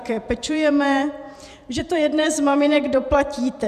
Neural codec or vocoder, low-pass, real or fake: vocoder, 48 kHz, 128 mel bands, Vocos; 14.4 kHz; fake